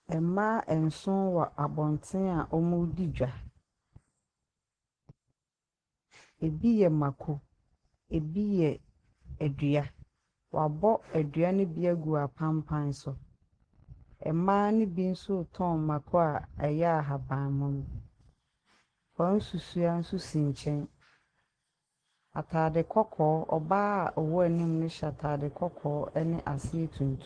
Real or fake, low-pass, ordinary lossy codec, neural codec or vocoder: real; 9.9 kHz; Opus, 16 kbps; none